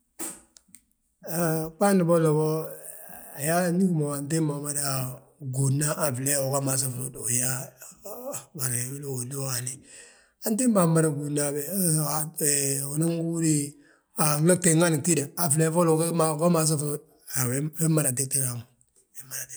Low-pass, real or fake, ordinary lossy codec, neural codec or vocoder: none; real; none; none